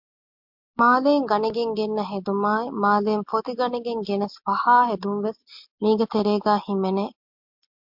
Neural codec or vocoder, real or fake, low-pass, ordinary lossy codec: none; real; 5.4 kHz; MP3, 48 kbps